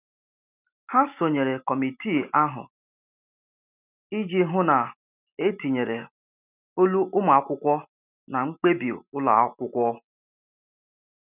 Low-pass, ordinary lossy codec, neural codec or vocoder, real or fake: 3.6 kHz; none; none; real